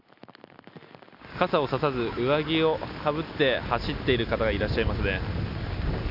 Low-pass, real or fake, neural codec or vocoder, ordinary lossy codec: 5.4 kHz; real; none; none